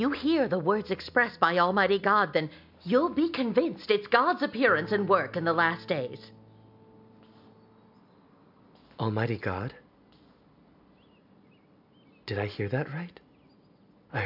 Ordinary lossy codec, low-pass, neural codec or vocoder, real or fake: MP3, 48 kbps; 5.4 kHz; none; real